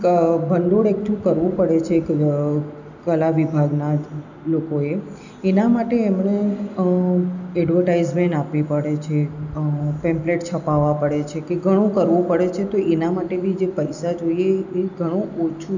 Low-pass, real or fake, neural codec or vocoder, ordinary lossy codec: 7.2 kHz; real; none; none